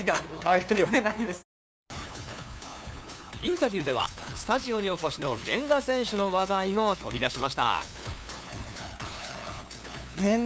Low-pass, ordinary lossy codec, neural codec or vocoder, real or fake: none; none; codec, 16 kHz, 2 kbps, FunCodec, trained on LibriTTS, 25 frames a second; fake